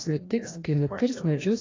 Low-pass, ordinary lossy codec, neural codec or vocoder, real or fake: 7.2 kHz; AAC, 32 kbps; codec, 16 kHz, 1 kbps, FreqCodec, larger model; fake